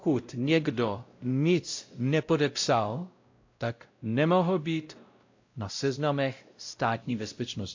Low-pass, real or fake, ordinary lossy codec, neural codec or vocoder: 7.2 kHz; fake; AAC, 48 kbps; codec, 16 kHz, 0.5 kbps, X-Codec, WavLM features, trained on Multilingual LibriSpeech